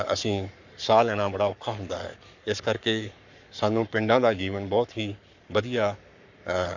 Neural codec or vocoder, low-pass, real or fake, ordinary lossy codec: codec, 44.1 kHz, 7.8 kbps, Pupu-Codec; 7.2 kHz; fake; none